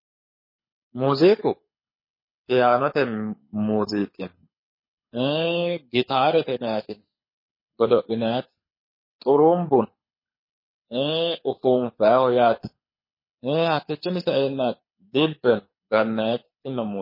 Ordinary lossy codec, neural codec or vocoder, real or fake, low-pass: MP3, 24 kbps; codec, 24 kHz, 6 kbps, HILCodec; fake; 5.4 kHz